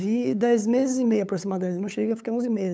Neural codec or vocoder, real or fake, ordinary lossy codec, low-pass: codec, 16 kHz, 8 kbps, FunCodec, trained on LibriTTS, 25 frames a second; fake; none; none